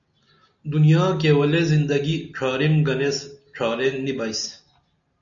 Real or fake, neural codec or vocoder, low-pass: real; none; 7.2 kHz